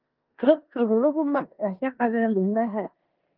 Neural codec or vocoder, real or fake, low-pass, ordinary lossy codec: codec, 16 kHz in and 24 kHz out, 0.9 kbps, LongCat-Audio-Codec, four codebook decoder; fake; 5.4 kHz; Opus, 24 kbps